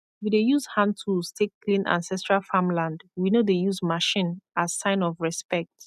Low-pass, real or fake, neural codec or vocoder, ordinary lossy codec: 14.4 kHz; real; none; none